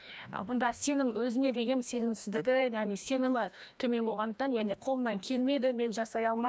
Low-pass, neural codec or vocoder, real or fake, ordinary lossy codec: none; codec, 16 kHz, 1 kbps, FreqCodec, larger model; fake; none